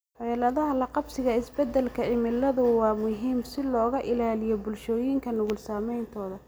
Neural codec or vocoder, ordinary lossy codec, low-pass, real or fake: none; none; none; real